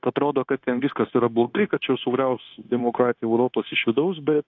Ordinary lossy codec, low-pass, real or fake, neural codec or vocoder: AAC, 48 kbps; 7.2 kHz; fake; codec, 16 kHz, 0.9 kbps, LongCat-Audio-Codec